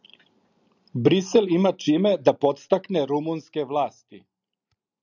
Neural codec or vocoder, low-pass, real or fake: none; 7.2 kHz; real